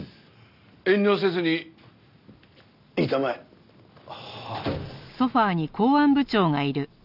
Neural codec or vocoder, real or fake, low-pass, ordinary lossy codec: none; real; 5.4 kHz; none